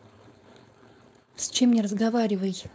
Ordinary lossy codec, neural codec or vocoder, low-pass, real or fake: none; codec, 16 kHz, 4.8 kbps, FACodec; none; fake